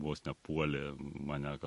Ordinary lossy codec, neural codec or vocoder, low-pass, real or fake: MP3, 48 kbps; none; 14.4 kHz; real